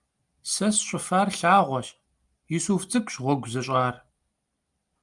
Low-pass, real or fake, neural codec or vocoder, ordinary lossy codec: 10.8 kHz; real; none; Opus, 32 kbps